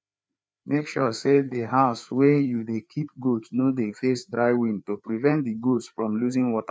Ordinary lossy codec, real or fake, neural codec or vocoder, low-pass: none; fake; codec, 16 kHz, 4 kbps, FreqCodec, larger model; none